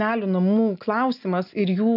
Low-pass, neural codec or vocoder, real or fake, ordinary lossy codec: 5.4 kHz; none; real; AAC, 48 kbps